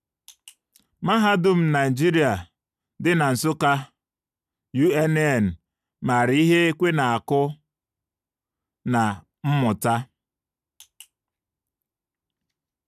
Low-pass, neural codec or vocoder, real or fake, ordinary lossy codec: 14.4 kHz; none; real; none